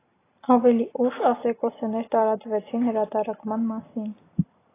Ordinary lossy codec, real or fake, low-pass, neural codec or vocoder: AAC, 16 kbps; real; 3.6 kHz; none